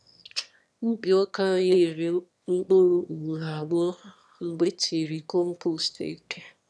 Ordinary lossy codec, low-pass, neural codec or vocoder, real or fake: none; none; autoencoder, 22.05 kHz, a latent of 192 numbers a frame, VITS, trained on one speaker; fake